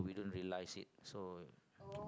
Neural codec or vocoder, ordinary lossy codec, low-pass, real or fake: none; none; none; real